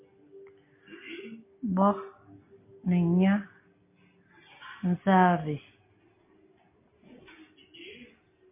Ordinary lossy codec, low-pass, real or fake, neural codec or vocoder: MP3, 24 kbps; 3.6 kHz; real; none